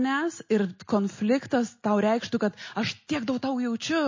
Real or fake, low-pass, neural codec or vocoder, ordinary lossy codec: real; 7.2 kHz; none; MP3, 32 kbps